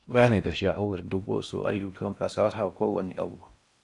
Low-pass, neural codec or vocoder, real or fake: 10.8 kHz; codec, 16 kHz in and 24 kHz out, 0.6 kbps, FocalCodec, streaming, 4096 codes; fake